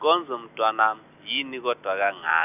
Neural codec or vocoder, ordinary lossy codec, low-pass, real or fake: none; none; 3.6 kHz; real